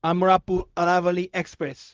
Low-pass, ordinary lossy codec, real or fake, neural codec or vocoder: 7.2 kHz; Opus, 24 kbps; fake; codec, 16 kHz, 0.4 kbps, LongCat-Audio-Codec